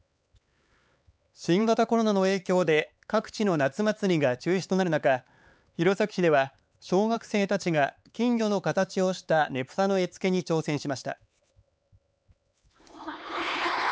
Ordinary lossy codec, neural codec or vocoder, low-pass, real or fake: none; codec, 16 kHz, 4 kbps, X-Codec, HuBERT features, trained on LibriSpeech; none; fake